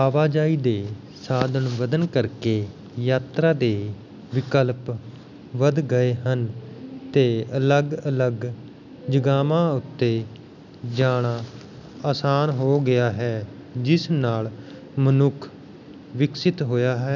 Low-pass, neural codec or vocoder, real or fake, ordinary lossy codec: 7.2 kHz; none; real; none